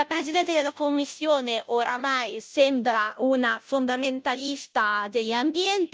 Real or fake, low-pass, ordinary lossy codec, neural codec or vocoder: fake; none; none; codec, 16 kHz, 0.5 kbps, FunCodec, trained on Chinese and English, 25 frames a second